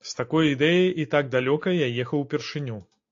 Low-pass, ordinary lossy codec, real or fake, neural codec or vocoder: 7.2 kHz; AAC, 48 kbps; real; none